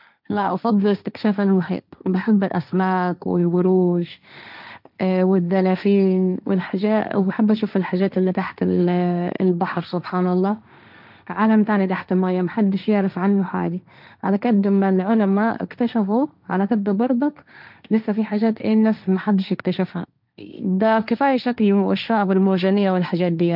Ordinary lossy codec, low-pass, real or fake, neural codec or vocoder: none; 5.4 kHz; fake; codec, 16 kHz, 1.1 kbps, Voila-Tokenizer